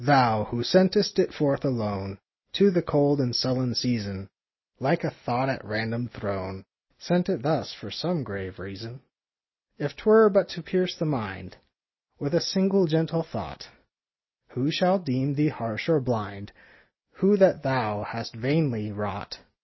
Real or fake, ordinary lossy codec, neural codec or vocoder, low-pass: real; MP3, 24 kbps; none; 7.2 kHz